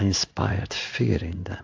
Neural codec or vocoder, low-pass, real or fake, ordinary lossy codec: codec, 16 kHz in and 24 kHz out, 1 kbps, XY-Tokenizer; 7.2 kHz; fake; AAC, 48 kbps